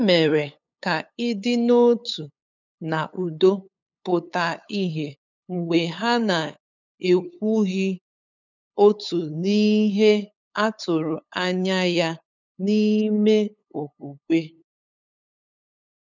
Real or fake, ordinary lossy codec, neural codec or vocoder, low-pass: fake; none; codec, 16 kHz, 8 kbps, FunCodec, trained on LibriTTS, 25 frames a second; 7.2 kHz